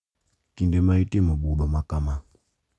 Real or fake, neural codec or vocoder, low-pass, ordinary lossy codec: real; none; none; none